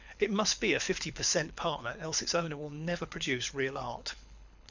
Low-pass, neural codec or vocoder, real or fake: 7.2 kHz; codec, 24 kHz, 6 kbps, HILCodec; fake